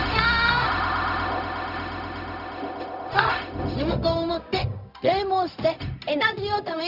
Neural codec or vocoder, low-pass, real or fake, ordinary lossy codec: codec, 16 kHz, 0.4 kbps, LongCat-Audio-Codec; 5.4 kHz; fake; none